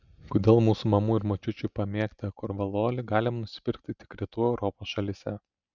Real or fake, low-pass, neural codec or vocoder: real; 7.2 kHz; none